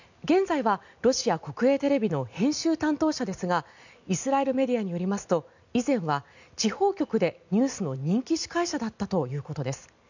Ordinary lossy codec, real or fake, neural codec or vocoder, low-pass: none; real; none; 7.2 kHz